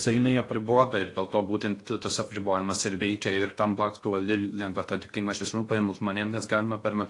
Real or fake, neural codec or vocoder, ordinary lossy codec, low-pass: fake; codec, 16 kHz in and 24 kHz out, 0.6 kbps, FocalCodec, streaming, 4096 codes; AAC, 48 kbps; 10.8 kHz